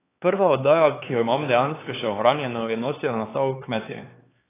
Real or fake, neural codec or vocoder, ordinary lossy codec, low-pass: fake; codec, 16 kHz, 4 kbps, X-Codec, HuBERT features, trained on LibriSpeech; AAC, 16 kbps; 3.6 kHz